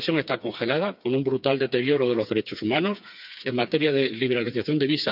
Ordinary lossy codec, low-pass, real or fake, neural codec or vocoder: none; 5.4 kHz; fake; codec, 16 kHz, 4 kbps, FreqCodec, smaller model